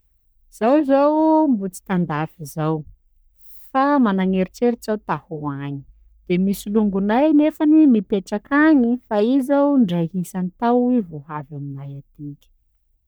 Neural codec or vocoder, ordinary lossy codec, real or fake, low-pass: codec, 44.1 kHz, 3.4 kbps, Pupu-Codec; none; fake; none